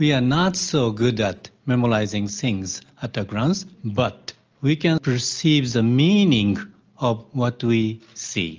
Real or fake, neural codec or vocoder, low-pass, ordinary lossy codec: real; none; 7.2 kHz; Opus, 24 kbps